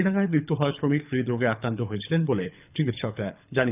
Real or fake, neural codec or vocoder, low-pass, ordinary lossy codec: fake; codec, 24 kHz, 6 kbps, HILCodec; 3.6 kHz; none